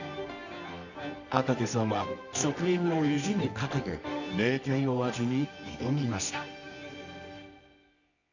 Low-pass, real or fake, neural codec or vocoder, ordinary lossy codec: 7.2 kHz; fake; codec, 24 kHz, 0.9 kbps, WavTokenizer, medium music audio release; none